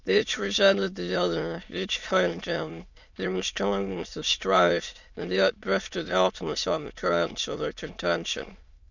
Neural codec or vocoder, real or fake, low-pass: autoencoder, 22.05 kHz, a latent of 192 numbers a frame, VITS, trained on many speakers; fake; 7.2 kHz